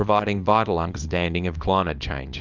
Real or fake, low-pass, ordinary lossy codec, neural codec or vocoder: fake; 7.2 kHz; Opus, 24 kbps; codec, 24 kHz, 0.9 kbps, WavTokenizer, small release